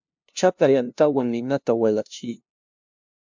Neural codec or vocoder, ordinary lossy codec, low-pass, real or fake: codec, 16 kHz, 0.5 kbps, FunCodec, trained on LibriTTS, 25 frames a second; MP3, 64 kbps; 7.2 kHz; fake